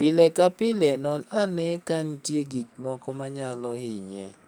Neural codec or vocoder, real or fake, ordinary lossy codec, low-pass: codec, 44.1 kHz, 2.6 kbps, SNAC; fake; none; none